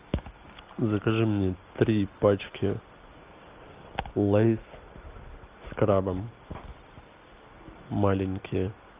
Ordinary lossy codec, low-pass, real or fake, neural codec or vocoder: Opus, 24 kbps; 3.6 kHz; real; none